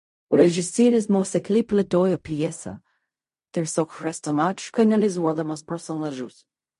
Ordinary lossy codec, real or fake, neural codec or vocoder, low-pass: MP3, 48 kbps; fake; codec, 16 kHz in and 24 kHz out, 0.4 kbps, LongCat-Audio-Codec, fine tuned four codebook decoder; 10.8 kHz